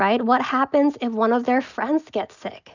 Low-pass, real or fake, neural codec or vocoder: 7.2 kHz; real; none